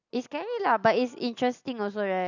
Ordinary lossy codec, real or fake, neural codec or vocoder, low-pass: none; real; none; 7.2 kHz